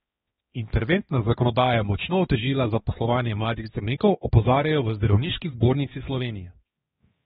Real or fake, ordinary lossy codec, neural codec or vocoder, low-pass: fake; AAC, 16 kbps; codec, 16 kHz, 2 kbps, X-Codec, HuBERT features, trained on balanced general audio; 7.2 kHz